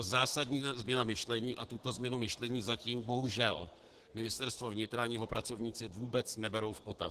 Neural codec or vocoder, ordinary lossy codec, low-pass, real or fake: codec, 32 kHz, 1.9 kbps, SNAC; Opus, 16 kbps; 14.4 kHz; fake